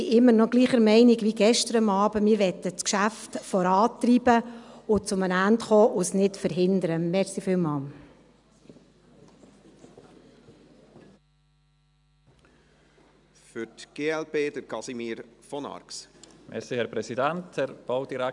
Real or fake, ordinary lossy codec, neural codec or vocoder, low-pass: real; none; none; 10.8 kHz